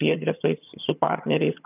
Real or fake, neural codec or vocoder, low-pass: fake; vocoder, 22.05 kHz, 80 mel bands, HiFi-GAN; 3.6 kHz